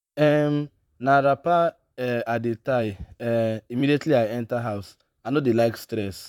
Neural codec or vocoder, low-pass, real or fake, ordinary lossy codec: vocoder, 44.1 kHz, 128 mel bands, Pupu-Vocoder; 19.8 kHz; fake; none